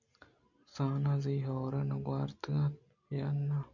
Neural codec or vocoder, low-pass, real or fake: none; 7.2 kHz; real